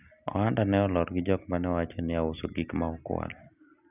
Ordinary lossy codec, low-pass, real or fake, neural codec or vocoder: none; 3.6 kHz; real; none